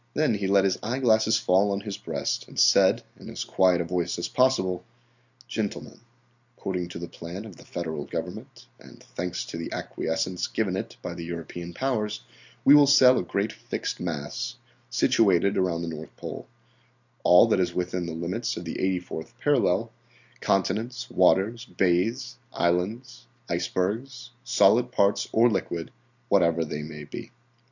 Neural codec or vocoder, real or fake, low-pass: none; real; 7.2 kHz